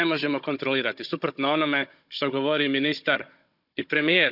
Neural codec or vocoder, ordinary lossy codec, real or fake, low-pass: codec, 16 kHz, 16 kbps, FunCodec, trained on Chinese and English, 50 frames a second; none; fake; 5.4 kHz